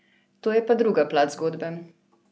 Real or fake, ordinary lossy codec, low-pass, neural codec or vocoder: real; none; none; none